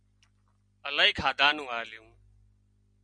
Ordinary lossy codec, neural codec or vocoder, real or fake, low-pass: MP3, 96 kbps; none; real; 9.9 kHz